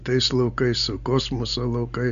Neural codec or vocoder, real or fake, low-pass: none; real; 7.2 kHz